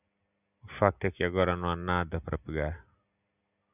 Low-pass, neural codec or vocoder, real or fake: 3.6 kHz; none; real